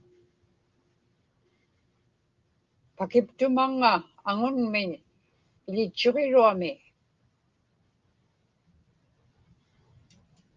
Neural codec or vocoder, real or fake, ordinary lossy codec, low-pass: none; real; Opus, 32 kbps; 7.2 kHz